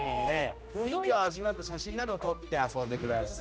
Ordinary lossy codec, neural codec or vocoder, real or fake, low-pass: none; codec, 16 kHz, 1 kbps, X-Codec, HuBERT features, trained on general audio; fake; none